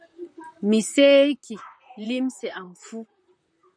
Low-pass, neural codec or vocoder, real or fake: 9.9 kHz; vocoder, 44.1 kHz, 128 mel bands, Pupu-Vocoder; fake